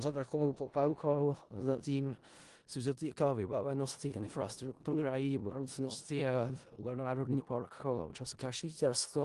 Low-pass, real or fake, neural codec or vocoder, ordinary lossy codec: 10.8 kHz; fake; codec, 16 kHz in and 24 kHz out, 0.4 kbps, LongCat-Audio-Codec, four codebook decoder; Opus, 24 kbps